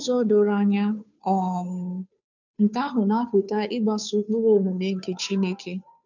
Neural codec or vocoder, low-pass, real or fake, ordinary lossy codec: codec, 16 kHz, 2 kbps, FunCodec, trained on Chinese and English, 25 frames a second; 7.2 kHz; fake; none